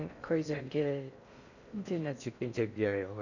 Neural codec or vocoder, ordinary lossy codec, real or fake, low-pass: codec, 16 kHz in and 24 kHz out, 0.6 kbps, FocalCodec, streaming, 4096 codes; none; fake; 7.2 kHz